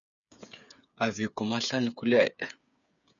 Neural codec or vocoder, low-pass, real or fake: codec, 16 kHz, 8 kbps, FreqCodec, smaller model; 7.2 kHz; fake